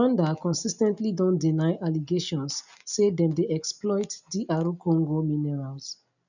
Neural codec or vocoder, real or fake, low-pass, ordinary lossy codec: none; real; 7.2 kHz; none